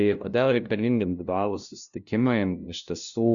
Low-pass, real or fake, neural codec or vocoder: 7.2 kHz; fake; codec, 16 kHz, 0.5 kbps, FunCodec, trained on LibriTTS, 25 frames a second